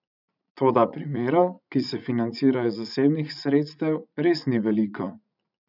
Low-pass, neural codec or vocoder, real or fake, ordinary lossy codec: 5.4 kHz; vocoder, 44.1 kHz, 80 mel bands, Vocos; fake; none